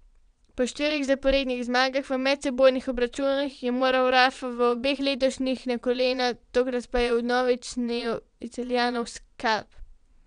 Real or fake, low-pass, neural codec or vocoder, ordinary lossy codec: fake; 9.9 kHz; vocoder, 22.05 kHz, 80 mel bands, Vocos; none